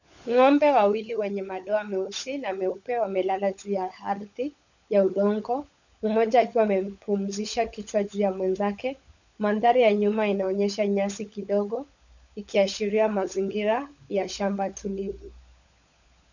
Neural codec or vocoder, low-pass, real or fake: codec, 16 kHz, 16 kbps, FunCodec, trained on LibriTTS, 50 frames a second; 7.2 kHz; fake